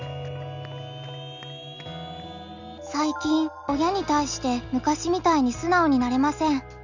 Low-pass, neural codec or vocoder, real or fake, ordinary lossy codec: 7.2 kHz; none; real; none